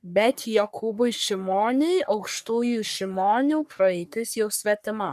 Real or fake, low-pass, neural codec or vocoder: fake; 14.4 kHz; codec, 44.1 kHz, 3.4 kbps, Pupu-Codec